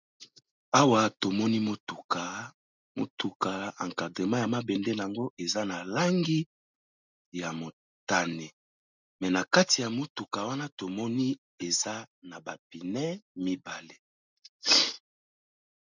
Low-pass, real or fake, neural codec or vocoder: 7.2 kHz; real; none